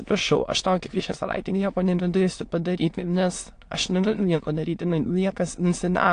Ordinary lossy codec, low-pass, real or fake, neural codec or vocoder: AAC, 48 kbps; 9.9 kHz; fake; autoencoder, 22.05 kHz, a latent of 192 numbers a frame, VITS, trained on many speakers